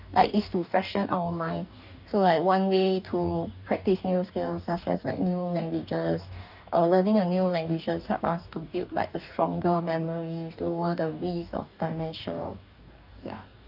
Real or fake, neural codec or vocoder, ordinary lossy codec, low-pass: fake; codec, 44.1 kHz, 2.6 kbps, DAC; none; 5.4 kHz